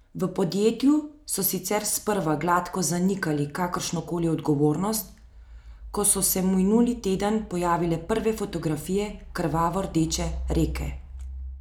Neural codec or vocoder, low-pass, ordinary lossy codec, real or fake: none; none; none; real